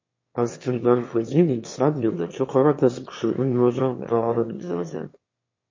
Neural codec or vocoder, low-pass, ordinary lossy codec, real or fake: autoencoder, 22.05 kHz, a latent of 192 numbers a frame, VITS, trained on one speaker; 7.2 kHz; MP3, 32 kbps; fake